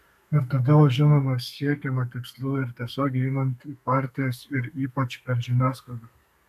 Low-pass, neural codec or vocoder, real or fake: 14.4 kHz; codec, 32 kHz, 1.9 kbps, SNAC; fake